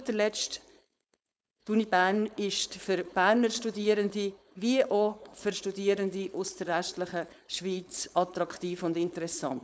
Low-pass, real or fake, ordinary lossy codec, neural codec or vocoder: none; fake; none; codec, 16 kHz, 4.8 kbps, FACodec